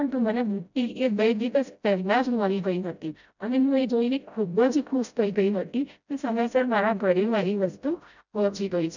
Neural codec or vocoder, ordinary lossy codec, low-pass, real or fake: codec, 16 kHz, 0.5 kbps, FreqCodec, smaller model; none; 7.2 kHz; fake